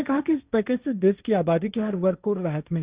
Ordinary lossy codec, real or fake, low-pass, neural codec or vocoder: Opus, 64 kbps; fake; 3.6 kHz; codec, 16 kHz, 1.1 kbps, Voila-Tokenizer